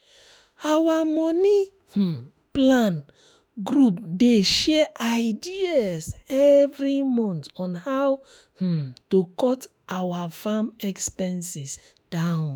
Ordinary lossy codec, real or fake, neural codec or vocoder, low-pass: none; fake; autoencoder, 48 kHz, 32 numbers a frame, DAC-VAE, trained on Japanese speech; none